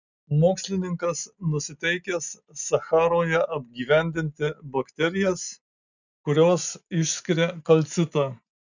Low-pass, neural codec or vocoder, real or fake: 7.2 kHz; none; real